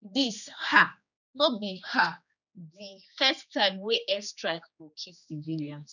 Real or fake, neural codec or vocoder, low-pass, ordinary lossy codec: fake; codec, 16 kHz, 2 kbps, X-Codec, HuBERT features, trained on general audio; 7.2 kHz; none